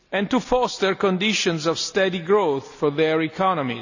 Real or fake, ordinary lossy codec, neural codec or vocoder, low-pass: real; none; none; 7.2 kHz